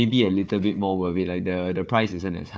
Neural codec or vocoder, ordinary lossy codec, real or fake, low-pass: codec, 16 kHz, 4 kbps, FunCodec, trained on Chinese and English, 50 frames a second; none; fake; none